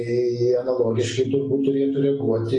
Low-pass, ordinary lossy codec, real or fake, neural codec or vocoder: 10.8 kHz; AAC, 32 kbps; real; none